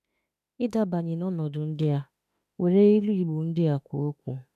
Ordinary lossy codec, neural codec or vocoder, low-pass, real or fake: none; autoencoder, 48 kHz, 32 numbers a frame, DAC-VAE, trained on Japanese speech; 14.4 kHz; fake